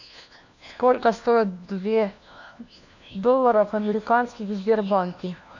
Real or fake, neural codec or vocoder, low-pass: fake; codec, 16 kHz, 1 kbps, FunCodec, trained on LibriTTS, 50 frames a second; 7.2 kHz